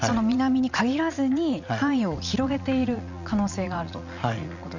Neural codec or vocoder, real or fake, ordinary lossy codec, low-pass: vocoder, 44.1 kHz, 80 mel bands, Vocos; fake; none; 7.2 kHz